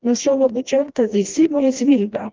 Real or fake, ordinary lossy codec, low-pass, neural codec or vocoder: fake; Opus, 32 kbps; 7.2 kHz; codec, 16 kHz, 1 kbps, FreqCodec, smaller model